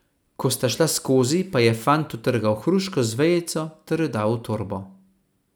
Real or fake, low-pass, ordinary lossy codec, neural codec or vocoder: real; none; none; none